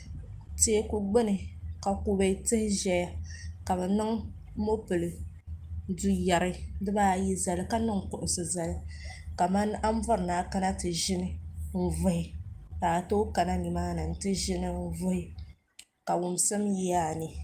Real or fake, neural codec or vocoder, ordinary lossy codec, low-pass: real; none; Opus, 32 kbps; 14.4 kHz